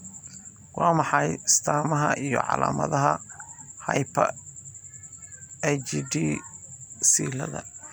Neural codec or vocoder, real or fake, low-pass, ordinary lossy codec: none; real; none; none